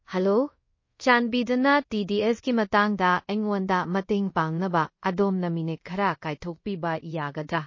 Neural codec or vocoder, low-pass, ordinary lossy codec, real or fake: codec, 24 kHz, 0.5 kbps, DualCodec; 7.2 kHz; MP3, 32 kbps; fake